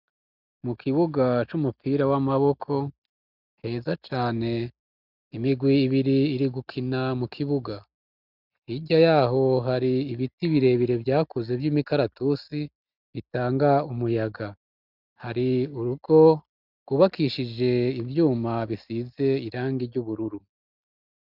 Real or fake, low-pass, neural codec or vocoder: real; 5.4 kHz; none